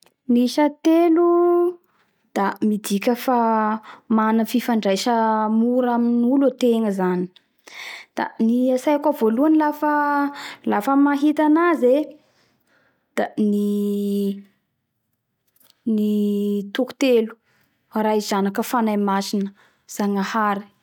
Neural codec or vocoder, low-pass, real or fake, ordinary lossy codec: none; 19.8 kHz; real; none